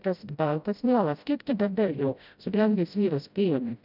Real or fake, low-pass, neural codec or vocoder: fake; 5.4 kHz; codec, 16 kHz, 0.5 kbps, FreqCodec, smaller model